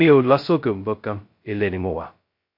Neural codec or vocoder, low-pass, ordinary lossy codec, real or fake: codec, 16 kHz, 0.2 kbps, FocalCodec; 5.4 kHz; MP3, 32 kbps; fake